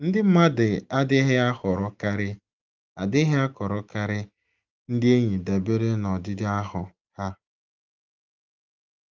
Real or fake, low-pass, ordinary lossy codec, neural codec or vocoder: real; 7.2 kHz; Opus, 24 kbps; none